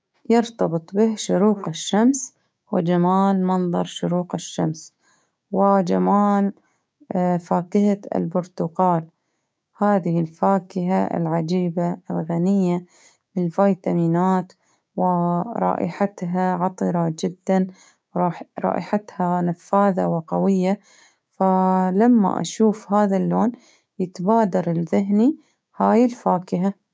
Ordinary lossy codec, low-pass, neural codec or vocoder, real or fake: none; none; codec, 16 kHz, 6 kbps, DAC; fake